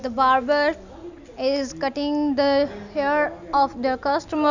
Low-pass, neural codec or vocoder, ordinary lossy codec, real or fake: 7.2 kHz; none; none; real